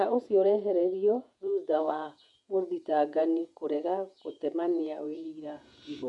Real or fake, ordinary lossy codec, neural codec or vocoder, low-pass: fake; none; vocoder, 48 kHz, 128 mel bands, Vocos; 10.8 kHz